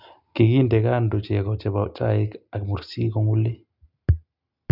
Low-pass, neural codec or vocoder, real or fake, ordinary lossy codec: 5.4 kHz; none; real; none